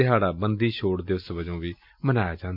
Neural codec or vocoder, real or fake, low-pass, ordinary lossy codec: none; real; 5.4 kHz; MP3, 48 kbps